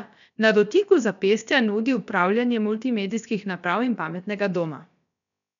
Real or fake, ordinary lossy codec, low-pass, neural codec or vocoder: fake; none; 7.2 kHz; codec, 16 kHz, about 1 kbps, DyCAST, with the encoder's durations